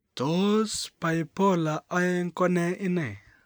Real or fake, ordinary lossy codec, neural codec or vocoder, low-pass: real; none; none; none